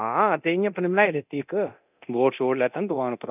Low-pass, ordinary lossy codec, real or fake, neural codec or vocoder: 3.6 kHz; none; fake; codec, 24 kHz, 0.9 kbps, DualCodec